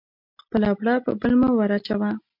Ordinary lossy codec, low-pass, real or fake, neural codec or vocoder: MP3, 48 kbps; 5.4 kHz; real; none